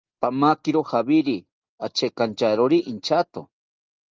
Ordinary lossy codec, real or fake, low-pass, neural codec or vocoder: Opus, 24 kbps; real; 7.2 kHz; none